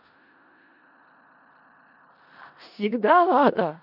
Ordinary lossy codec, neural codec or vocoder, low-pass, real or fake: none; codec, 16 kHz in and 24 kHz out, 0.4 kbps, LongCat-Audio-Codec, four codebook decoder; 5.4 kHz; fake